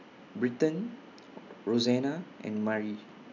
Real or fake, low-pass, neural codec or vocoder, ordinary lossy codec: real; 7.2 kHz; none; none